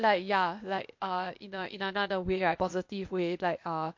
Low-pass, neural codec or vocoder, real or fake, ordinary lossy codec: 7.2 kHz; codec, 16 kHz, 0.8 kbps, ZipCodec; fake; MP3, 48 kbps